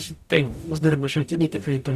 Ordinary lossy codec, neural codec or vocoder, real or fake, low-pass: Opus, 64 kbps; codec, 44.1 kHz, 0.9 kbps, DAC; fake; 14.4 kHz